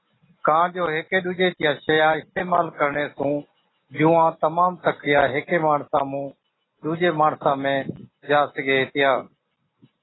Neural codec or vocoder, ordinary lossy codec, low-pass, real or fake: none; AAC, 16 kbps; 7.2 kHz; real